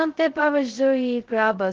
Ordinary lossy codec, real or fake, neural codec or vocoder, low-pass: Opus, 16 kbps; fake; codec, 16 kHz, 0.2 kbps, FocalCodec; 7.2 kHz